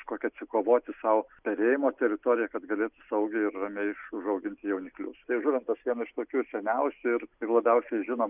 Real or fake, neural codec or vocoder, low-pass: real; none; 3.6 kHz